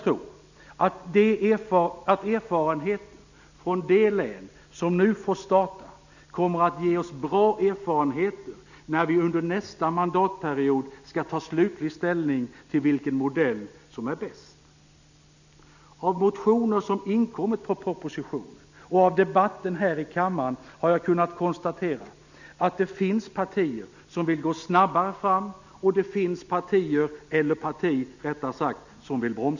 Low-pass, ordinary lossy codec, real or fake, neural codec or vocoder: 7.2 kHz; none; real; none